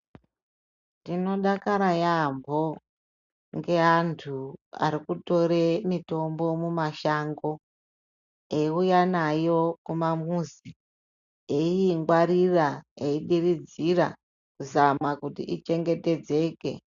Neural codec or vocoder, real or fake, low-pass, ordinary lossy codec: none; real; 7.2 kHz; MP3, 96 kbps